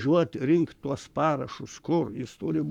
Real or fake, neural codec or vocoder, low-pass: fake; codec, 44.1 kHz, 7.8 kbps, Pupu-Codec; 19.8 kHz